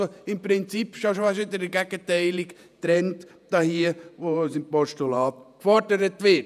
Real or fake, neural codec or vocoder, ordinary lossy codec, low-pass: fake; vocoder, 48 kHz, 128 mel bands, Vocos; none; 14.4 kHz